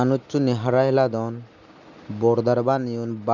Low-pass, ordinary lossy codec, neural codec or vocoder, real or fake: 7.2 kHz; none; none; real